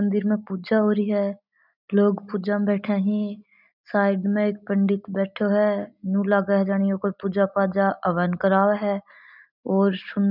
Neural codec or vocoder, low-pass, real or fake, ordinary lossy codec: none; 5.4 kHz; real; none